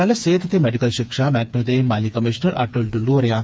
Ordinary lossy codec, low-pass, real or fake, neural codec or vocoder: none; none; fake; codec, 16 kHz, 4 kbps, FreqCodec, smaller model